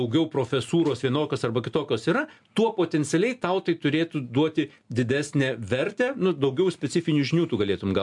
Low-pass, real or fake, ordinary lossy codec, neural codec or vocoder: 10.8 kHz; real; MP3, 64 kbps; none